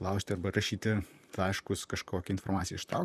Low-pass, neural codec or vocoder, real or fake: 14.4 kHz; vocoder, 44.1 kHz, 128 mel bands, Pupu-Vocoder; fake